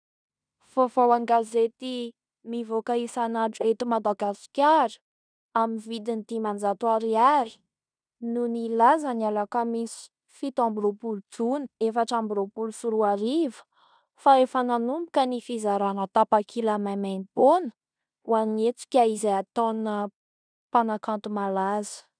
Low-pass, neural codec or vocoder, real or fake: 9.9 kHz; codec, 16 kHz in and 24 kHz out, 0.9 kbps, LongCat-Audio-Codec, fine tuned four codebook decoder; fake